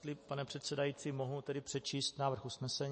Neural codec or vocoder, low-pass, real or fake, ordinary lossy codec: none; 10.8 kHz; real; MP3, 32 kbps